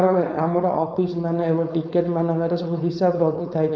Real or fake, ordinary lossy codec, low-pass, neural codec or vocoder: fake; none; none; codec, 16 kHz, 4.8 kbps, FACodec